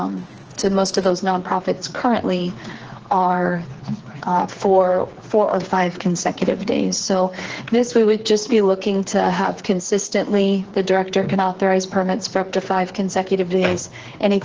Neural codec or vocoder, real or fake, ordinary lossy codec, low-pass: codec, 16 kHz, 4 kbps, FreqCodec, smaller model; fake; Opus, 16 kbps; 7.2 kHz